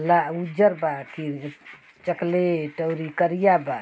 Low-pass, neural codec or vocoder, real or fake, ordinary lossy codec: none; none; real; none